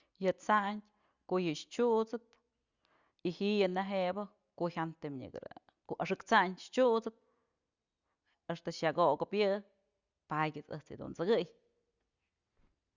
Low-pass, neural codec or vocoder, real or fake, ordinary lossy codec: 7.2 kHz; none; real; Opus, 64 kbps